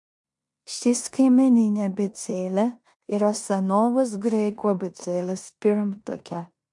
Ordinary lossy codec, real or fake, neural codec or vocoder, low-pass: MP3, 64 kbps; fake; codec, 16 kHz in and 24 kHz out, 0.9 kbps, LongCat-Audio-Codec, four codebook decoder; 10.8 kHz